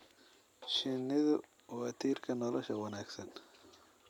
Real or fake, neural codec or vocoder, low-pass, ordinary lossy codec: real; none; 19.8 kHz; none